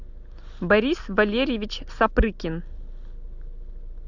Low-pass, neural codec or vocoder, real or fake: 7.2 kHz; none; real